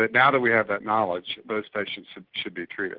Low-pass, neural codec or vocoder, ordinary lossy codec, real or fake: 5.4 kHz; none; Opus, 16 kbps; real